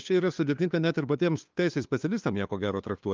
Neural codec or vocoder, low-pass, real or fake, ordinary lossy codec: codec, 16 kHz, 2 kbps, FunCodec, trained on LibriTTS, 25 frames a second; 7.2 kHz; fake; Opus, 24 kbps